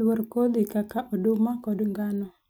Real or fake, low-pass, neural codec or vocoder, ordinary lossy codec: real; none; none; none